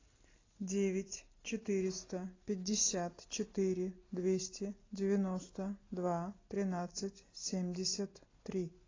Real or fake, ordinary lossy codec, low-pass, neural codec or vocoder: real; AAC, 32 kbps; 7.2 kHz; none